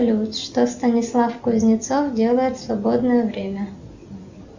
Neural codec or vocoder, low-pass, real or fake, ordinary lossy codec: none; 7.2 kHz; real; Opus, 64 kbps